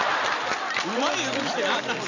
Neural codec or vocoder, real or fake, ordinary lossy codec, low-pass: none; real; none; 7.2 kHz